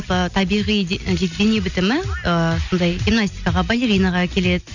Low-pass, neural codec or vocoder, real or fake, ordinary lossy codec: 7.2 kHz; none; real; none